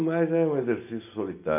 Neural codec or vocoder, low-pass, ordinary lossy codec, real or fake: none; 3.6 kHz; none; real